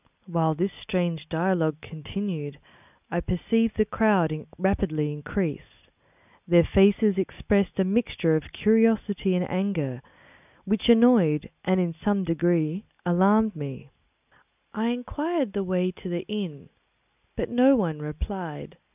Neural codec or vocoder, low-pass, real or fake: none; 3.6 kHz; real